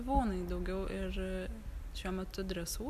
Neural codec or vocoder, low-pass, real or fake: none; 14.4 kHz; real